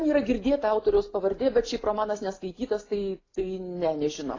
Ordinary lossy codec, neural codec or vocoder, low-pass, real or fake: AAC, 32 kbps; none; 7.2 kHz; real